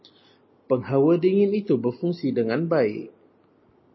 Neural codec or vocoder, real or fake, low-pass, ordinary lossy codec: none; real; 7.2 kHz; MP3, 24 kbps